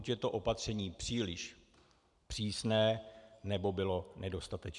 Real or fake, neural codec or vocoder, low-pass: real; none; 10.8 kHz